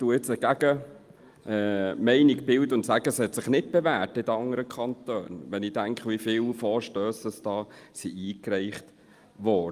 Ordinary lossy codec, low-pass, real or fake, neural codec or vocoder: Opus, 32 kbps; 14.4 kHz; real; none